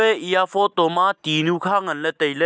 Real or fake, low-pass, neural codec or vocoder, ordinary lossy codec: real; none; none; none